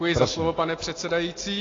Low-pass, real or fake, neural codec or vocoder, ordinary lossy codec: 7.2 kHz; real; none; AAC, 32 kbps